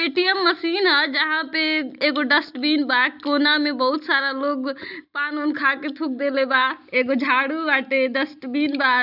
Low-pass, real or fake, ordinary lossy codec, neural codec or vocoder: 5.4 kHz; real; none; none